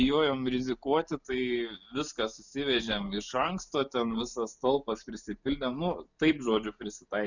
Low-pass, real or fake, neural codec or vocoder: 7.2 kHz; real; none